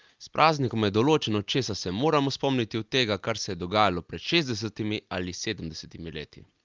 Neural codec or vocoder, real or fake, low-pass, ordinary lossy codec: none; real; 7.2 kHz; Opus, 24 kbps